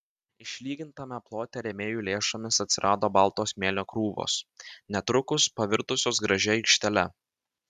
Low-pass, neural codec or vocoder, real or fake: 14.4 kHz; none; real